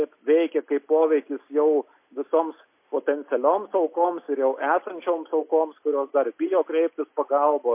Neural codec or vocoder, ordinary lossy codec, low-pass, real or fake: none; MP3, 24 kbps; 3.6 kHz; real